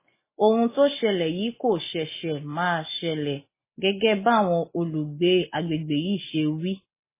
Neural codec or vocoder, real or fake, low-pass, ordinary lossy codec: none; real; 3.6 kHz; MP3, 16 kbps